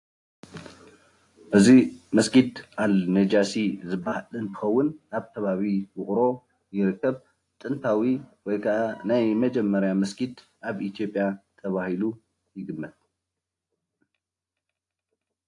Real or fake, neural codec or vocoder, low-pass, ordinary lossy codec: real; none; 10.8 kHz; AAC, 48 kbps